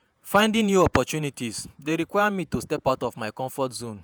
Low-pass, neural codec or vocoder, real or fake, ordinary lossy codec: none; vocoder, 48 kHz, 128 mel bands, Vocos; fake; none